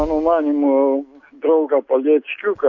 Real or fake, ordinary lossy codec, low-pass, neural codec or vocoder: real; AAC, 48 kbps; 7.2 kHz; none